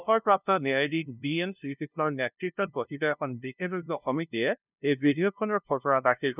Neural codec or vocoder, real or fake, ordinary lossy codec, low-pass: codec, 16 kHz, 0.5 kbps, FunCodec, trained on LibriTTS, 25 frames a second; fake; none; 3.6 kHz